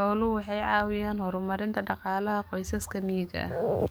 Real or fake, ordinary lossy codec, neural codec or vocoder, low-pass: fake; none; codec, 44.1 kHz, 7.8 kbps, DAC; none